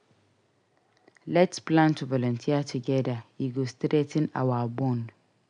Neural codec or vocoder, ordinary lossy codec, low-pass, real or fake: none; none; 9.9 kHz; real